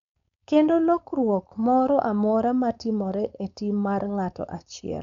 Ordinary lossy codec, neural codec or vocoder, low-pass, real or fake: none; codec, 16 kHz, 4.8 kbps, FACodec; 7.2 kHz; fake